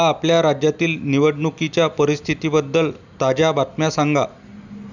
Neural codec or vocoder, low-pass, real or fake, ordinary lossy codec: none; 7.2 kHz; real; none